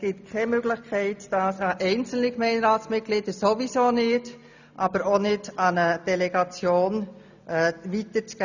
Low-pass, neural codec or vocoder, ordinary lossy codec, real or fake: 7.2 kHz; none; none; real